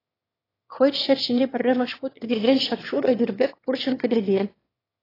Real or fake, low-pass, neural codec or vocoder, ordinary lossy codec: fake; 5.4 kHz; autoencoder, 22.05 kHz, a latent of 192 numbers a frame, VITS, trained on one speaker; AAC, 24 kbps